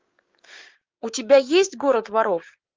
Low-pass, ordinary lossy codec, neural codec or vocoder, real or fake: 7.2 kHz; Opus, 32 kbps; codec, 16 kHz, 6 kbps, DAC; fake